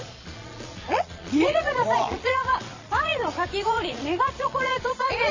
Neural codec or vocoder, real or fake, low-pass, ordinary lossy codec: vocoder, 22.05 kHz, 80 mel bands, Vocos; fake; 7.2 kHz; MP3, 32 kbps